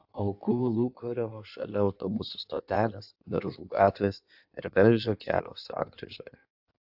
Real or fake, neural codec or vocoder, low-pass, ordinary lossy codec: fake; codec, 16 kHz in and 24 kHz out, 1.1 kbps, FireRedTTS-2 codec; 5.4 kHz; AAC, 48 kbps